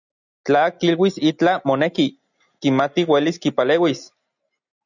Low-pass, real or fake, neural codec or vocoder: 7.2 kHz; real; none